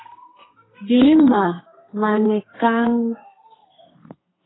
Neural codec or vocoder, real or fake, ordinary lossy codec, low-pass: codec, 32 kHz, 1.9 kbps, SNAC; fake; AAC, 16 kbps; 7.2 kHz